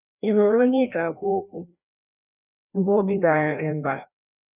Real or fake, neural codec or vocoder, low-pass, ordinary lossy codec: fake; codec, 16 kHz, 1 kbps, FreqCodec, larger model; 3.6 kHz; none